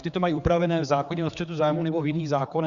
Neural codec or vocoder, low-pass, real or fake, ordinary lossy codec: codec, 16 kHz, 4 kbps, X-Codec, HuBERT features, trained on general audio; 7.2 kHz; fake; Opus, 64 kbps